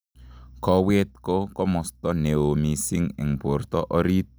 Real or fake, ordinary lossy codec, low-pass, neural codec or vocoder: real; none; none; none